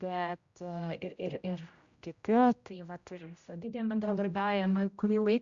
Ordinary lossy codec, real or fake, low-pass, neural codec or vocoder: Opus, 64 kbps; fake; 7.2 kHz; codec, 16 kHz, 0.5 kbps, X-Codec, HuBERT features, trained on general audio